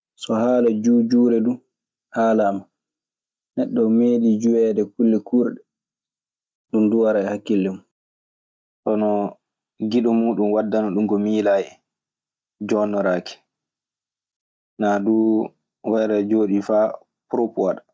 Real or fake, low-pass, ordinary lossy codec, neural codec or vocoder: real; none; none; none